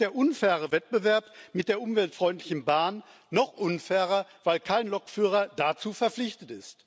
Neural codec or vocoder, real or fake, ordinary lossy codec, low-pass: none; real; none; none